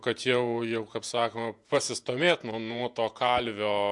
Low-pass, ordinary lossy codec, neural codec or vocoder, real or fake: 10.8 kHz; MP3, 64 kbps; vocoder, 48 kHz, 128 mel bands, Vocos; fake